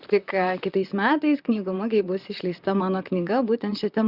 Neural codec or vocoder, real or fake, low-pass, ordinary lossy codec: vocoder, 44.1 kHz, 128 mel bands, Pupu-Vocoder; fake; 5.4 kHz; Opus, 64 kbps